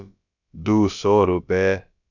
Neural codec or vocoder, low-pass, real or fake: codec, 16 kHz, about 1 kbps, DyCAST, with the encoder's durations; 7.2 kHz; fake